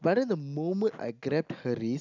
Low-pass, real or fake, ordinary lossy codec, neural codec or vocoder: 7.2 kHz; real; none; none